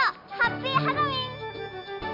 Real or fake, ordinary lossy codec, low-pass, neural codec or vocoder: real; MP3, 48 kbps; 5.4 kHz; none